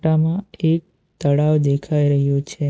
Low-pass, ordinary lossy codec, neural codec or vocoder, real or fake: none; none; none; real